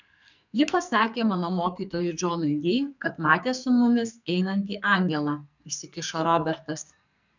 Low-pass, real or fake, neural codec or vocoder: 7.2 kHz; fake; codec, 44.1 kHz, 2.6 kbps, SNAC